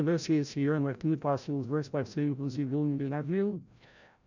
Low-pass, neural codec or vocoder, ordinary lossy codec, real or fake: 7.2 kHz; codec, 16 kHz, 0.5 kbps, FreqCodec, larger model; none; fake